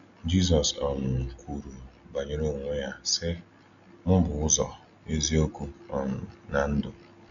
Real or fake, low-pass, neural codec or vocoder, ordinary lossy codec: real; 7.2 kHz; none; none